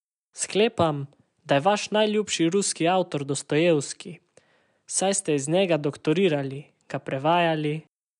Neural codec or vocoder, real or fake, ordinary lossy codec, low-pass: none; real; none; 10.8 kHz